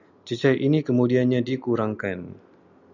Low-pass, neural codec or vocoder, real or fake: 7.2 kHz; none; real